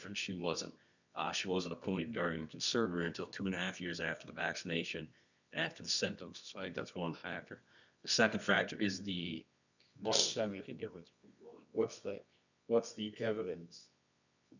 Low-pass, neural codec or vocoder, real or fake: 7.2 kHz; codec, 24 kHz, 0.9 kbps, WavTokenizer, medium music audio release; fake